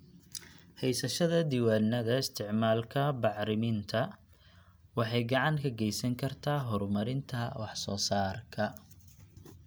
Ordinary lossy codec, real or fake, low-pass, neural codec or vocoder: none; real; none; none